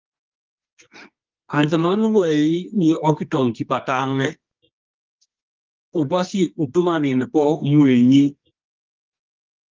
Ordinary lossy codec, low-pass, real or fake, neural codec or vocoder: Opus, 32 kbps; 7.2 kHz; fake; codec, 24 kHz, 0.9 kbps, WavTokenizer, medium music audio release